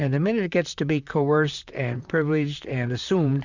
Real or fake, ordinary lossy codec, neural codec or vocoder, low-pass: fake; Opus, 64 kbps; vocoder, 44.1 kHz, 128 mel bands, Pupu-Vocoder; 7.2 kHz